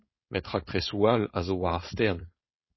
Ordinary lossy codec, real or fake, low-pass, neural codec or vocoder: MP3, 24 kbps; fake; 7.2 kHz; codec, 16 kHz, 4.8 kbps, FACodec